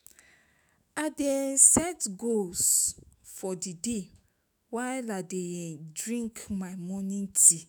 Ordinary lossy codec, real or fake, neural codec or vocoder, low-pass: none; fake; autoencoder, 48 kHz, 128 numbers a frame, DAC-VAE, trained on Japanese speech; none